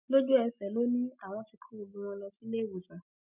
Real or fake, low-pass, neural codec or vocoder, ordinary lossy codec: real; 3.6 kHz; none; none